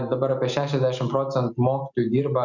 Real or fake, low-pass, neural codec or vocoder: real; 7.2 kHz; none